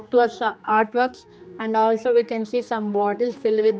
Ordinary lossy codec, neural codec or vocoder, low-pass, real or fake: none; codec, 16 kHz, 2 kbps, X-Codec, HuBERT features, trained on general audio; none; fake